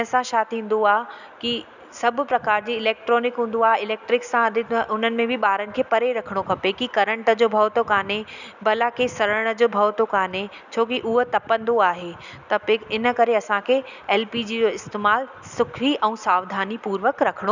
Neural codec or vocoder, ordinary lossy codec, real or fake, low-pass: none; none; real; 7.2 kHz